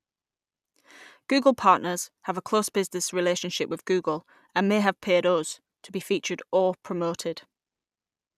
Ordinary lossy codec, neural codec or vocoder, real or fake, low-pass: none; none; real; 14.4 kHz